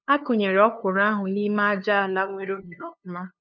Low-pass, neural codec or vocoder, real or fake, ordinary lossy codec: none; codec, 16 kHz, 2 kbps, FunCodec, trained on LibriTTS, 25 frames a second; fake; none